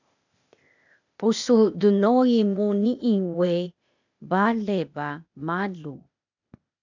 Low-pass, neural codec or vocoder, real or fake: 7.2 kHz; codec, 16 kHz, 0.8 kbps, ZipCodec; fake